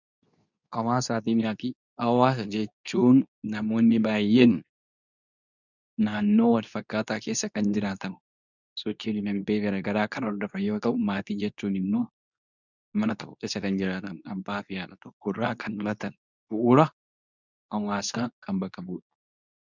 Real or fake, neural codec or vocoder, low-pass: fake; codec, 24 kHz, 0.9 kbps, WavTokenizer, medium speech release version 2; 7.2 kHz